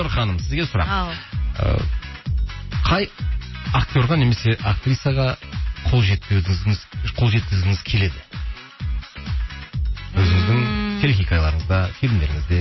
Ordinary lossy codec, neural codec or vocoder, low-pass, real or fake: MP3, 24 kbps; none; 7.2 kHz; real